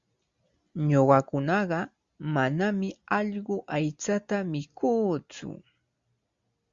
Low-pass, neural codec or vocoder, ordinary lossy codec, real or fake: 7.2 kHz; none; Opus, 64 kbps; real